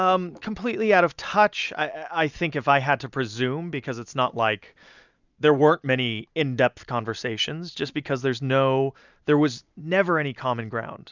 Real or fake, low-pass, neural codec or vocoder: real; 7.2 kHz; none